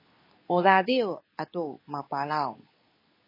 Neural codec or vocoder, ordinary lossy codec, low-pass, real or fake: codec, 24 kHz, 0.9 kbps, WavTokenizer, medium speech release version 2; MP3, 24 kbps; 5.4 kHz; fake